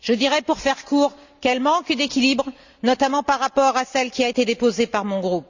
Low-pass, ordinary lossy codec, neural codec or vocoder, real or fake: 7.2 kHz; Opus, 64 kbps; none; real